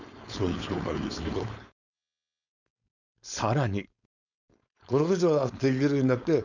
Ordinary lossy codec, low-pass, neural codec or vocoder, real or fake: none; 7.2 kHz; codec, 16 kHz, 4.8 kbps, FACodec; fake